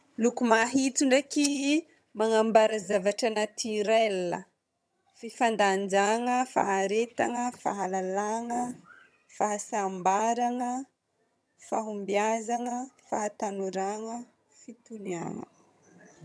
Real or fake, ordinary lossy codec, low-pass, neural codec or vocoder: fake; none; none; vocoder, 22.05 kHz, 80 mel bands, HiFi-GAN